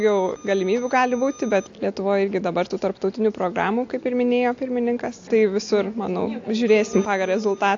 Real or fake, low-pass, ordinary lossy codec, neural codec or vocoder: real; 7.2 kHz; AAC, 48 kbps; none